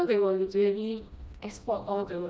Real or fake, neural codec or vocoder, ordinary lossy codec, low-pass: fake; codec, 16 kHz, 1 kbps, FreqCodec, smaller model; none; none